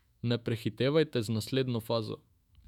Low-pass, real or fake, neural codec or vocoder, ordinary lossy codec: 19.8 kHz; fake; autoencoder, 48 kHz, 128 numbers a frame, DAC-VAE, trained on Japanese speech; none